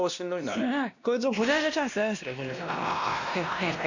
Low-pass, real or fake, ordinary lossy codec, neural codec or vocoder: 7.2 kHz; fake; none; codec, 16 kHz, 1 kbps, X-Codec, WavLM features, trained on Multilingual LibriSpeech